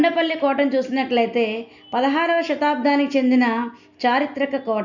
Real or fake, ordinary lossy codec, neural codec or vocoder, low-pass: real; none; none; 7.2 kHz